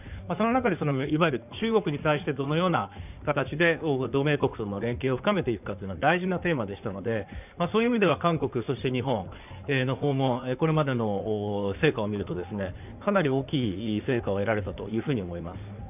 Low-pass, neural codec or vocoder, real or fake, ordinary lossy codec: 3.6 kHz; codec, 16 kHz in and 24 kHz out, 2.2 kbps, FireRedTTS-2 codec; fake; none